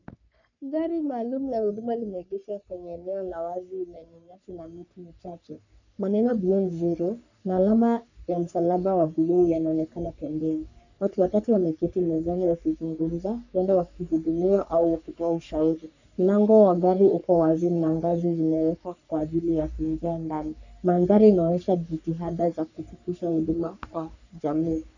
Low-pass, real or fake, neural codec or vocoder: 7.2 kHz; fake; codec, 44.1 kHz, 3.4 kbps, Pupu-Codec